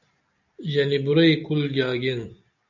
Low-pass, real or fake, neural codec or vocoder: 7.2 kHz; real; none